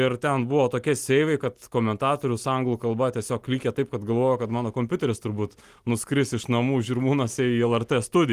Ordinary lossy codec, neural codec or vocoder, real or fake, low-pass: Opus, 24 kbps; none; real; 14.4 kHz